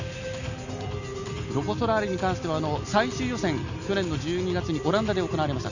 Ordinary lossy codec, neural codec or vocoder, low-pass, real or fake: none; none; 7.2 kHz; real